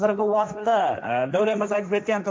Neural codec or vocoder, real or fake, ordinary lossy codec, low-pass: codec, 16 kHz, 1.1 kbps, Voila-Tokenizer; fake; none; none